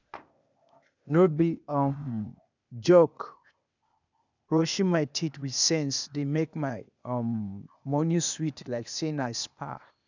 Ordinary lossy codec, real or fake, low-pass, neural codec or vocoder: none; fake; 7.2 kHz; codec, 16 kHz, 0.8 kbps, ZipCodec